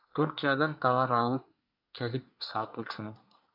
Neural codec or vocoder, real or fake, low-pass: codec, 24 kHz, 1 kbps, SNAC; fake; 5.4 kHz